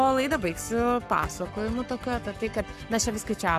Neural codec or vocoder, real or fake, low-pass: codec, 44.1 kHz, 7.8 kbps, Pupu-Codec; fake; 14.4 kHz